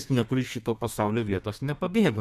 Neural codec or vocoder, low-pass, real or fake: codec, 32 kHz, 1.9 kbps, SNAC; 14.4 kHz; fake